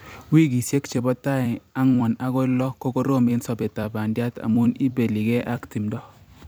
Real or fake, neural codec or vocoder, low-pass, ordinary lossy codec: fake; vocoder, 44.1 kHz, 128 mel bands every 256 samples, BigVGAN v2; none; none